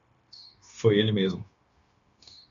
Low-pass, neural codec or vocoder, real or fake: 7.2 kHz; codec, 16 kHz, 0.9 kbps, LongCat-Audio-Codec; fake